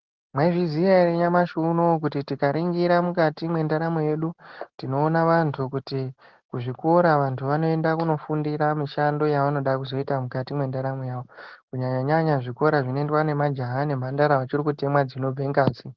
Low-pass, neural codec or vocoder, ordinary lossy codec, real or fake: 7.2 kHz; none; Opus, 16 kbps; real